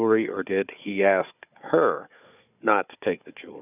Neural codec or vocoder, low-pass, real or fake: codec, 16 kHz, 4 kbps, FunCodec, trained on Chinese and English, 50 frames a second; 3.6 kHz; fake